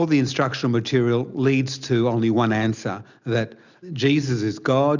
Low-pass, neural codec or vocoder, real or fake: 7.2 kHz; none; real